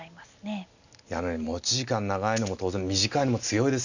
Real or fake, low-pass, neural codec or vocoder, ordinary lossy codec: real; 7.2 kHz; none; none